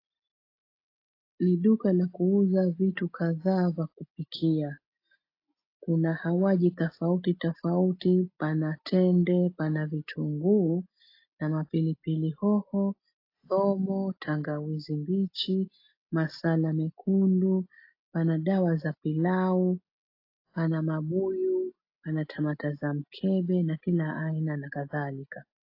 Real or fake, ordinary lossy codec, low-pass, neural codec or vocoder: real; AAC, 32 kbps; 5.4 kHz; none